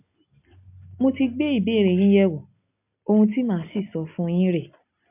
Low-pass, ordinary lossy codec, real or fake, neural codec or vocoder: 3.6 kHz; MP3, 32 kbps; real; none